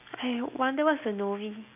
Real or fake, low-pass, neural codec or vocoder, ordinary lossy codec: real; 3.6 kHz; none; none